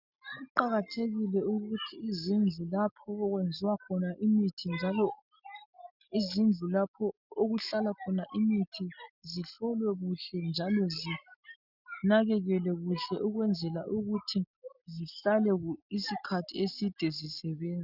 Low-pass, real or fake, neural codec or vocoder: 5.4 kHz; real; none